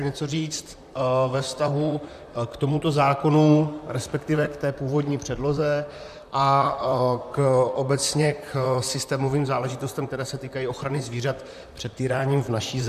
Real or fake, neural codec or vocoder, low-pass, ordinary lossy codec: fake; vocoder, 44.1 kHz, 128 mel bands, Pupu-Vocoder; 14.4 kHz; MP3, 96 kbps